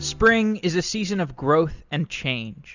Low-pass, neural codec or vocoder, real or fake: 7.2 kHz; none; real